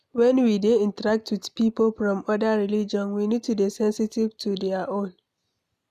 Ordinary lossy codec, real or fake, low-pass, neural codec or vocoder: none; real; 14.4 kHz; none